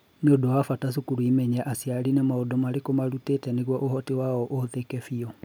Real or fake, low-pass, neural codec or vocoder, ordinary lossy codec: real; none; none; none